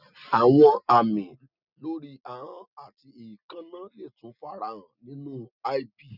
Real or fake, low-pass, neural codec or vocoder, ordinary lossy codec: real; 5.4 kHz; none; none